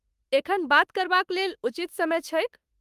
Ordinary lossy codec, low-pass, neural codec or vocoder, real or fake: Opus, 32 kbps; 14.4 kHz; autoencoder, 48 kHz, 32 numbers a frame, DAC-VAE, trained on Japanese speech; fake